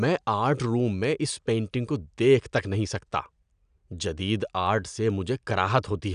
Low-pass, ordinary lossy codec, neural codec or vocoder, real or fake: 9.9 kHz; none; none; real